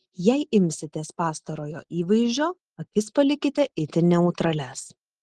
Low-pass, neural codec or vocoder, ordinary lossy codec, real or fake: 10.8 kHz; none; Opus, 16 kbps; real